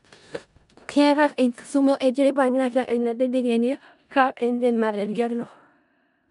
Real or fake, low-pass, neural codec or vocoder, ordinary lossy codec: fake; 10.8 kHz; codec, 16 kHz in and 24 kHz out, 0.4 kbps, LongCat-Audio-Codec, four codebook decoder; none